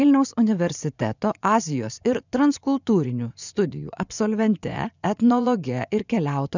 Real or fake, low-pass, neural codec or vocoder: fake; 7.2 kHz; vocoder, 24 kHz, 100 mel bands, Vocos